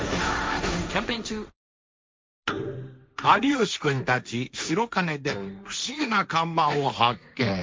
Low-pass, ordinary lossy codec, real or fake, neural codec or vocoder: none; none; fake; codec, 16 kHz, 1.1 kbps, Voila-Tokenizer